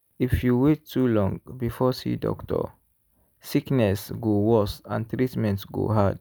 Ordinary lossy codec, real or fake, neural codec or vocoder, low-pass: none; real; none; none